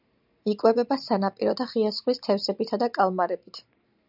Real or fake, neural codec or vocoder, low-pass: real; none; 5.4 kHz